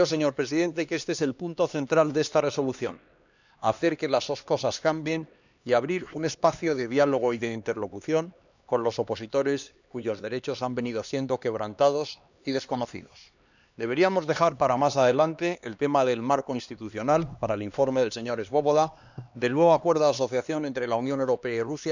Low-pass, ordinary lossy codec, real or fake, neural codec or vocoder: 7.2 kHz; none; fake; codec, 16 kHz, 2 kbps, X-Codec, HuBERT features, trained on LibriSpeech